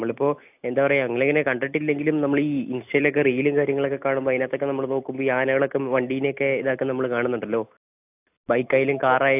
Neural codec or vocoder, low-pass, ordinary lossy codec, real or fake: none; 3.6 kHz; AAC, 32 kbps; real